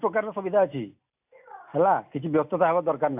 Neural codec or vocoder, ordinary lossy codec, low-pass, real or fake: none; AAC, 32 kbps; 3.6 kHz; real